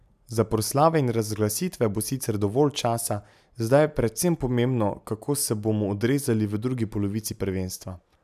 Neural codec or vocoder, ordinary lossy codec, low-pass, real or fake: none; none; 14.4 kHz; real